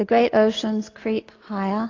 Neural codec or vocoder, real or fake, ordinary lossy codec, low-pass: none; real; AAC, 32 kbps; 7.2 kHz